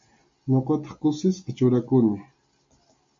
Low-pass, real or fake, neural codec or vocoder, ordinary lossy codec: 7.2 kHz; real; none; MP3, 48 kbps